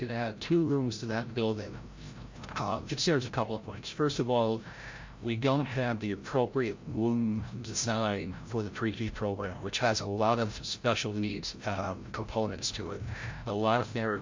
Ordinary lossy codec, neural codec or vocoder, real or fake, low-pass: MP3, 48 kbps; codec, 16 kHz, 0.5 kbps, FreqCodec, larger model; fake; 7.2 kHz